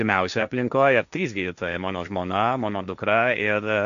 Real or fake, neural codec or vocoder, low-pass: fake; codec, 16 kHz, 1.1 kbps, Voila-Tokenizer; 7.2 kHz